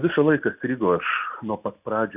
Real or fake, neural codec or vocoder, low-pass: real; none; 3.6 kHz